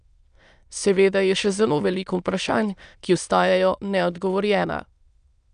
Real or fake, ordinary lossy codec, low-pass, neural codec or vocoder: fake; none; 9.9 kHz; autoencoder, 22.05 kHz, a latent of 192 numbers a frame, VITS, trained on many speakers